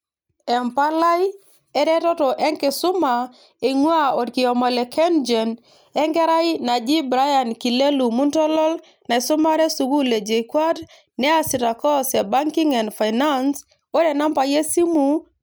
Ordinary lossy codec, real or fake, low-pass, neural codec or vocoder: none; real; none; none